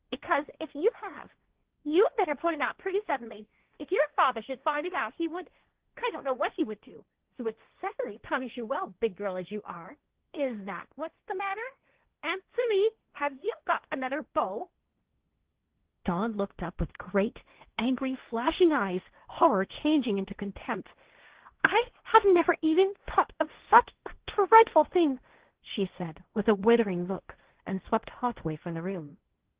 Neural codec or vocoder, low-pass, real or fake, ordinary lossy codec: codec, 16 kHz, 1.1 kbps, Voila-Tokenizer; 3.6 kHz; fake; Opus, 16 kbps